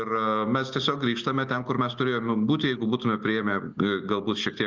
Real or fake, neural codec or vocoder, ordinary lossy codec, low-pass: real; none; Opus, 32 kbps; 7.2 kHz